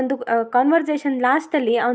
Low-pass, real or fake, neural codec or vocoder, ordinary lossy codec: none; real; none; none